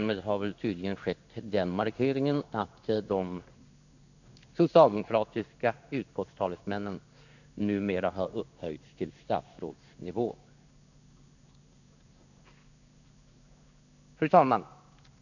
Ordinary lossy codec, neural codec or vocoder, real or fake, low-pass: none; codec, 16 kHz in and 24 kHz out, 1 kbps, XY-Tokenizer; fake; 7.2 kHz